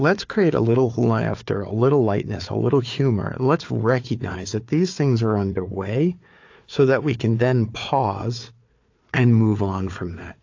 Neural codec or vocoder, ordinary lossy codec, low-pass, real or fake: codec, 16 kHz, 4 kbps, FunCodec, trained on Chinese and English, 50 frames a second; AAC, 48 kbps; 7.2 kHz; fake